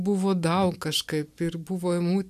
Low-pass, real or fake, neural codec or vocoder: 14.4 kHz; real; none